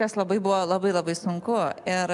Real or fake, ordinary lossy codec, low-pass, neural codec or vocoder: real; MP3, 96 kbps; 10.8 kHz; none